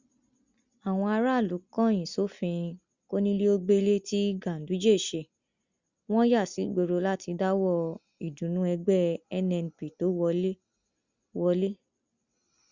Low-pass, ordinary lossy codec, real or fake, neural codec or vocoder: 7.2 kHz; Opus, 64 kbps; real; none